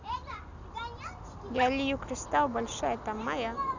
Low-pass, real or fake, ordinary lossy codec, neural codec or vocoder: 7.2 kHz; real; none; none